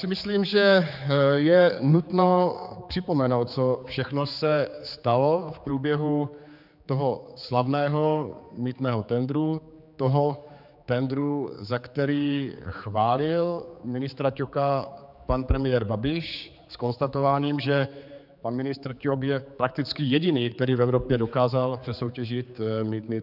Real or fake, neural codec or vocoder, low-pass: fake; codec, 16 kHz, 4 kbps, X-Codec, HuBERT features, trained on general audio; 5.4 kHz